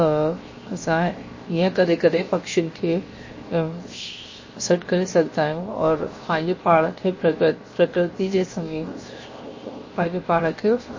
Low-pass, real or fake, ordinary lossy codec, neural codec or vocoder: 7.2 kHz; fake; MP3, 32 kbps; codec, 16 kHz, 0.7 kbps, FocalCodec